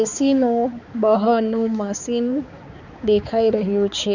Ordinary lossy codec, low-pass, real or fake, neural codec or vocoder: none; 7.2 kHz; fake; codec, 16 kHz, 4 kbps, X-Codec, HuBERT features, trained on balanced general audio